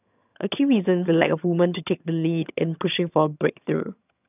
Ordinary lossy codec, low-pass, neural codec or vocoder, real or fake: none; 3.6 kHz; vocoder, 22.05 kHz, 80 mel bands, HiFi-GAN; fake